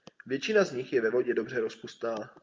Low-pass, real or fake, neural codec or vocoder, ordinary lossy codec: 7.2 kHz; real; none; Opus, 24 kbps